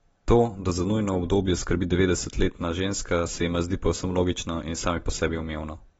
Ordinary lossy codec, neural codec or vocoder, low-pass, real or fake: AAC, 24 kbps; none; 19.8 kHz; real